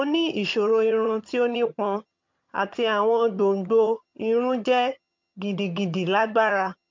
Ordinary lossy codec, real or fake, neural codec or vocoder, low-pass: MP3, 48 kbps; fake; vocoder, 22.05 kHz, 80 mel bands, HiFi-GAN; 7.2 kHz